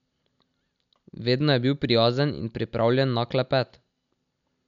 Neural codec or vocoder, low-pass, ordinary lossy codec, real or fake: none; 7.2 kHz; none; real